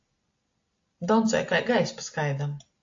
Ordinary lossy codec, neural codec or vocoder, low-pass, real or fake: AAC, 48 kbps; none; 7.2 kHz; real